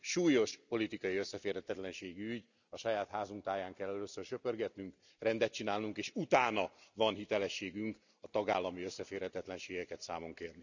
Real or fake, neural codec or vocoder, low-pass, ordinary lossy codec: real; none; 7.2 kHz; none